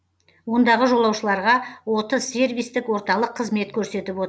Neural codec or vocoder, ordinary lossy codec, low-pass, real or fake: none; none; none; real